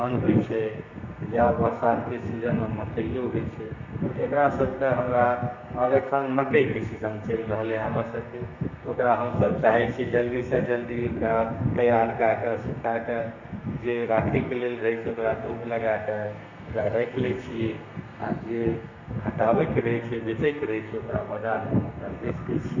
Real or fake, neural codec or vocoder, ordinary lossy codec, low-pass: fake; codec, 32 kHz, 1.9 kbps, SNAC; none; 7.2 kHz